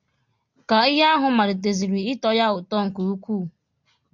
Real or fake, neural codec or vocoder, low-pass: real; none; 7.2 kHz